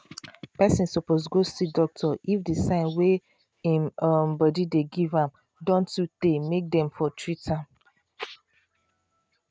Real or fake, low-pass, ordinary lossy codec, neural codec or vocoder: real; none; none; none